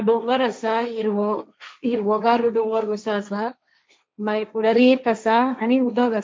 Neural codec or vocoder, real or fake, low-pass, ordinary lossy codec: codec, 16 kHz, 1.1 kbps, Voila-Tokenizer; fake; none; none